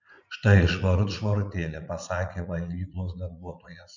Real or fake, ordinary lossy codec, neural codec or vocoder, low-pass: fake; MP3, 64 kbps; vocoder, 44.1 kHz, 80 mel bands, Vocos; 7.2 kHz